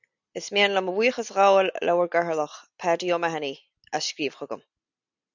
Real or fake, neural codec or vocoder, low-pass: real; none; 7.2 kHz